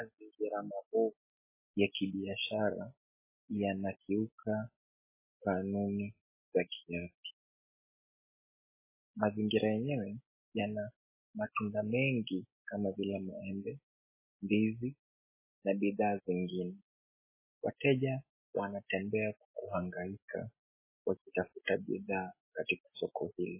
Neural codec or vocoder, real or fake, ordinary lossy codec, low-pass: none; real; MP3, 16 kbps; 3.6 kHz